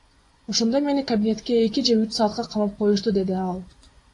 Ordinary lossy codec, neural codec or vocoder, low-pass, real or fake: AAC, 48 kbps; vocoder, 24 kHz, 100 mel bands, Vocos; 10.8 kHz; fake